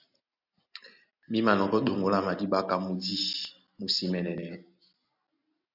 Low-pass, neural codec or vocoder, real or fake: 5.4 kHz; none; real